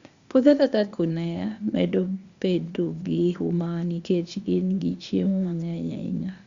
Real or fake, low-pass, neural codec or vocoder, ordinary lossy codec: fake; 7.2 kHz; codec, 16 kHz, 0.8 kbps, ZipCodec; Opus, 64 kbps